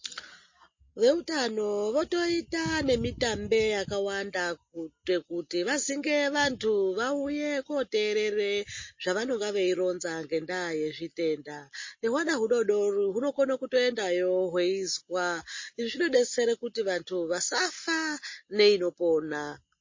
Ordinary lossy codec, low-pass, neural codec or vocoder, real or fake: MP3, 32 kbps; 7.2 kHz; none; real